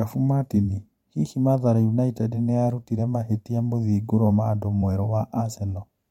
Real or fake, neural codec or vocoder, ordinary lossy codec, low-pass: fake; vocoder, 44.1 kHz, 128 mel bands every 512 samples, BigVGAN v2; MP3, 64 kbps; 19.8 kHz